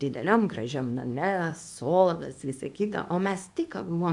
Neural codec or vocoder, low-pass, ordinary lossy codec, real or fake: codec, 24 kHz, 0.9 kbps, WavTokenizer, small release; 10.8 kHz; AAC, 64 kbps; fake